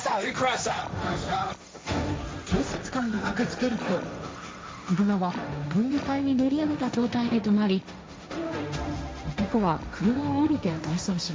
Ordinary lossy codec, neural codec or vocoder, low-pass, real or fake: none; codec, 16 kHz, 1.1 kbps, Voila-Tokenizer; none; fake